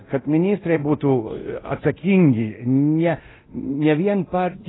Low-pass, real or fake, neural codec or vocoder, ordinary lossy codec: 7.2 kHz; fake; codec, 24 kHz, 0.9 kbps, DualCodec; AAC, 16 kbps